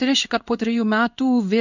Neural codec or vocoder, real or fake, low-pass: codec, 16 kHz in and 24 kHz out, 1 kbps, XY-Tokenizer; fake; 7.2 kHz